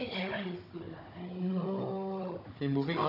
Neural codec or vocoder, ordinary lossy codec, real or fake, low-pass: codec, 16 kHz, 16 kbps, FunCodec, trained on Chinese and English, 50 frames a second; none; fake; 5.4 kHz